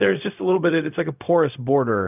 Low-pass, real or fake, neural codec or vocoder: 3.6 kHz; fake; codec, 16 kHz, 0.4 kbps, LongCat-Audio-Codec